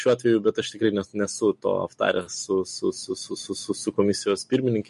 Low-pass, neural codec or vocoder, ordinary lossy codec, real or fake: 14.4 kHz; none; MP3, 48 kbps; real